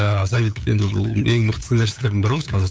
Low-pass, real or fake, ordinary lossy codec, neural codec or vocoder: none; fake; none; codec, 16 kHz, 8 kbps, FunCodec, trained on LibriTTS, 25 frames a second